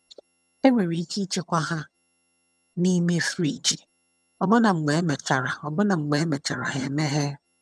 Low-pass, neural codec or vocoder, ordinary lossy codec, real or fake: none; vocoder, 22.05 kHz, 80 mel bands, HiFi-GAN; none; fake